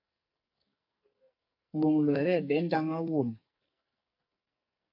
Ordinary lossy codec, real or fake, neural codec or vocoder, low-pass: MP3, 48 kbps; fake; codec, 44.1 kHz, 2.6 kbps, SNAC; 5.4 kHz